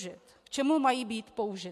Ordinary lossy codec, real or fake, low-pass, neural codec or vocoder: MP3, 64 kbps; real; 14.4 kHz; none